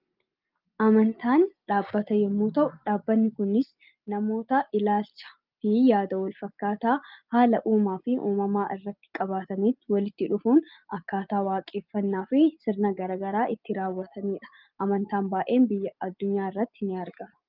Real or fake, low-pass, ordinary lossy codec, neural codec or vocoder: real; 5.4 kHz; Opus, 24 kbps; none